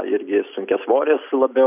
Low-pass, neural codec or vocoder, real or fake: 3.6 kHz; none; real